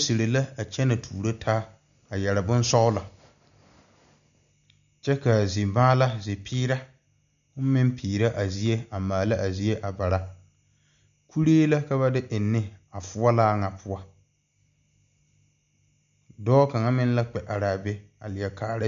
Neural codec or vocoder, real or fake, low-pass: none; real; 7.2 kHz